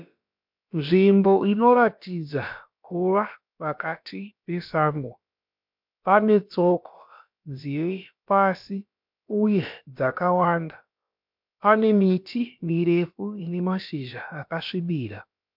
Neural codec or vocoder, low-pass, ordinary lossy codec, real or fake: codec, 16 kHz, about 1 kbps, DyCAST, with the encoder's durations; 5.4 kHz; MP3, 48 kbps; fake